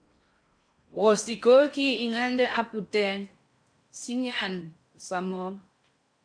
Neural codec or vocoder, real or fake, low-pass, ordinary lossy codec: codec, 16 kHz in and 24 kHz out, 0.6 kbps, FocalCodec, streaming, 4096 codes; fake; 9.9 kHz; MP3, 64 kbps